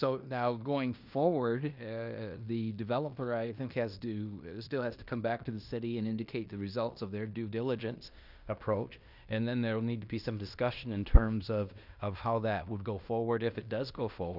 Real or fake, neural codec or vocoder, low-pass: fake; codec, 16 kHz in and 24 kHz out, 0.9 kbps, LongCat-Audio-Codec, fine tuned four codebook decoder; 5.4 kHz